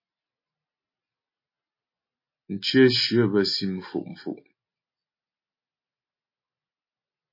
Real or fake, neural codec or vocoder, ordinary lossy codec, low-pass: real; none; MP3, 24 kbps; 5.4 kHz